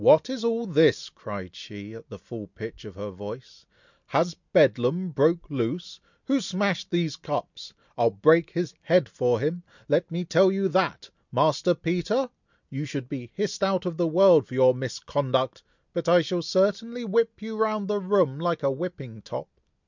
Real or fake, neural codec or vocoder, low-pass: real; none; 7.2 kHz